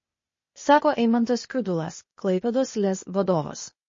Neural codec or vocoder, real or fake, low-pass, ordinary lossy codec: codec, 16 kHz, 0.8 kbps, ZipCodec; fake; 7.2 kHz; MP3, 32 kbps